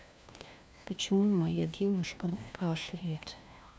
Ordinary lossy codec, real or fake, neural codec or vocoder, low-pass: none; fake; codec, 16 kHz, 1 kbps, FunCodec, trained on LibriTTS, 50 frames a second; none